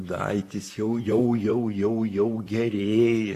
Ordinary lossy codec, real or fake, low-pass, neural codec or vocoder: AAC, 48 kbps; fake; 14.4 kHz; vocoder, 44.1 kHz, 128 mel bands, Pupu-Vocoder